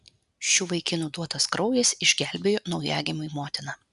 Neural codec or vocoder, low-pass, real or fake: none; 10.8 kHz; real